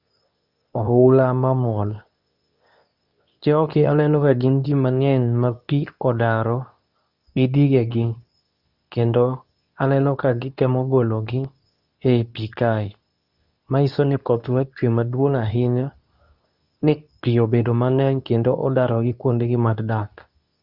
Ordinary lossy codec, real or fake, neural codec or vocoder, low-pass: Opus, 64 kbps; fake; codec, 24 kHz, 0.9 kbps, WavTokenizer, medium speech release version 2; 5.4 kHz